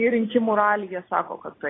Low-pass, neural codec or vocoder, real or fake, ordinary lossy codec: 7.2 kHz; none; real; AAC, 16 kbps